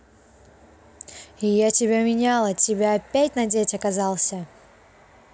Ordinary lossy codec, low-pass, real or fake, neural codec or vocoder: none; none; real; none